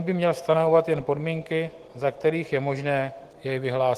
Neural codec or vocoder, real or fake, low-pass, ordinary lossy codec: autoencoder, 48 kHz, 128 numbers a frame, DAC-VAE, trained on Japanese speech; fake; 14.4 kHz; Opus, 16 kbps